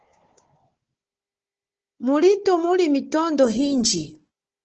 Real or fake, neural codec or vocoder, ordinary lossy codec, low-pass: fake; codec, 16 kHz, 4 kbps, FunCodec, trained on Chinese and English, 50 frames a second; Opus, 16 kbps; 7.2 kHz